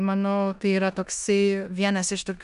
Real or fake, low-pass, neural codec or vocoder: fake; 10.8 kHz; codec, 16 kHz in and 24 kHz out, 0.9 kbps, LongCat-Audio-Codec, four codebook decoder